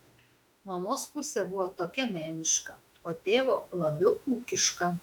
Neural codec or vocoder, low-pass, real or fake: autoencoder, 48 kHz, 32 numbers a frame, DAC-VAE, trained on Japanese speech; 19.8 kHz; fake